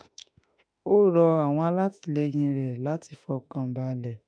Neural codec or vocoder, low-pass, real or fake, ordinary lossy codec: autoencoder, 48 kHz, 32 numbers a frame, DAC-VAE, trained on Japanese speech; 9.9 kHz; fake; none